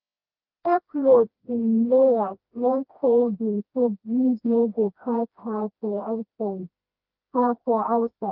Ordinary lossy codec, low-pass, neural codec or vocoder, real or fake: Opus, 24 kbps; 5.4 kHz; codec, 16 kHz, 1 kbps, FreqCodec, smaller model; fake